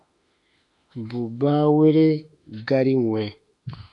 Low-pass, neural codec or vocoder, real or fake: 10.8 kHz; autoencoder, 48 kHz, 32 numbers a frame, DAC-VAE, trained on Japanese speech; fake